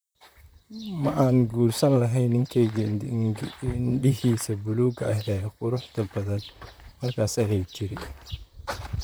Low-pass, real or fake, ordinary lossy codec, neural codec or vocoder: none; fake; none; vocoder, 44.1 kHz, 128 mel bands, Pupu-Vocoder